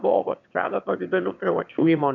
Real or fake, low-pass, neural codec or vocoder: fake; 7.2 kHz; autoencoder, 22.05 kHz, a latent of 192 numbers a frame, VITS, trained on one speaker